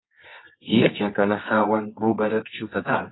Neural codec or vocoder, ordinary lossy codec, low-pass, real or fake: codec, 24 kHz, 0.9 kbps, WavTokenizer, medium music audio release; AAC, 16 kbps; 7.2 kHz; fake